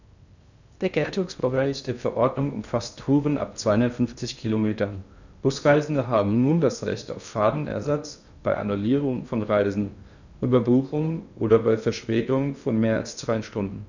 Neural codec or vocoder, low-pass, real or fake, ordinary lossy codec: codec, 16 kHz in and 24 kHz out, 0.6 kbps, FocalCodec, streaming, 2048 codes; 7.2 kHz; fake; none